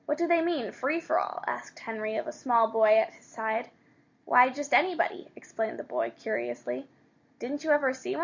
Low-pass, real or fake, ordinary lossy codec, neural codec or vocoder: 7.2 kHz; real; MP3, 64 kbps; none